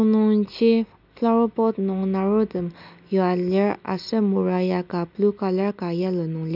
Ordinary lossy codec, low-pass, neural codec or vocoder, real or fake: none; 5.4 kHz; none; real